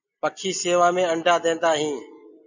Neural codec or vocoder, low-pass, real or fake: none; 7.2 kHz; real